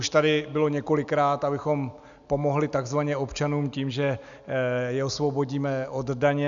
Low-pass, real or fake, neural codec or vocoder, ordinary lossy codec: 7.2 kHz; real; none; MP3, 96 kbps